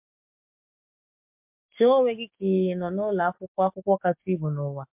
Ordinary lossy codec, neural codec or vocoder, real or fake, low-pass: MP3, 32 kbps; codec, 44.1 kHz, 7.8 kbps, DAC; fake; 3.6 kHz